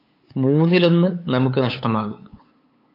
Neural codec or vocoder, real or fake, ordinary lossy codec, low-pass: codec, 16 kHz, 8 kbps, FunCodec, trained on LibriTTS, 25 frames a second; fake; AAC, 32 kbps; 5.4 kHz